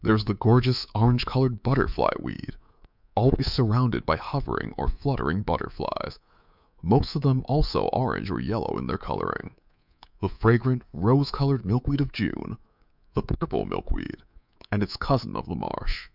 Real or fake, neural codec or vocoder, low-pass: fake; codec, 24 kHz, 3.1 kbps, DualCodec; 5.4 kHz